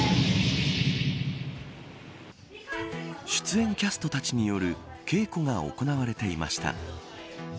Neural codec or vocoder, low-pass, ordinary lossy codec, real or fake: none; none; none; real